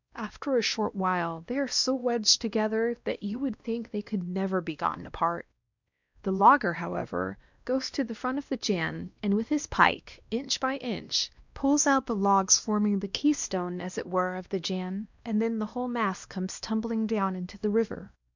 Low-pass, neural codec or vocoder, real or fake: 7.2 kHz; codec, 16 kHz, 1 kbps, X-Codec, WavLM features, trained on Multilingual LibriSpeech; fake